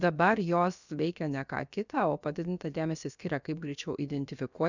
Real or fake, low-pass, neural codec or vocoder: fake; 7.2 kHz; codec, 16 kHz, about 1 kbps, DyCAST, with the encoder's durations